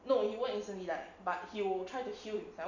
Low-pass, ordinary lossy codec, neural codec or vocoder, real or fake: 7.2 kHz; none; none; real